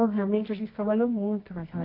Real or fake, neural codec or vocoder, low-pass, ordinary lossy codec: fake; codec, 24 kHz, 0.9 kbps, WavTokenizer, medium music audio release; 5.4 kHz; MP3, 32 kbps